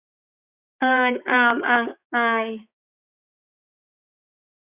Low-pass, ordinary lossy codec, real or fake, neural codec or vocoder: 3.6 kHz; Opus, 64 kbps; fake; codec, 16 kHz, 4 kbps, X-Codec, HuBERT features, trained on balanced general audio